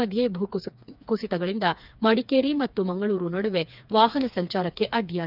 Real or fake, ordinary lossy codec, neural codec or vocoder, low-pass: fake; none; codec, 16 kHz, 4 kbps, FreqCodec, smaller model; 5.4 kHz